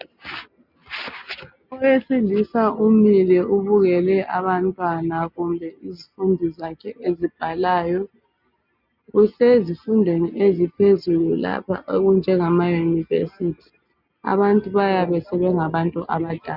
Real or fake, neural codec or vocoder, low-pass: real; none; 5.4 kHz